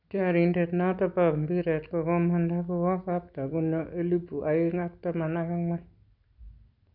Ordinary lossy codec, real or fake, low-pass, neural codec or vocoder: none; real; 5.4 kHz; none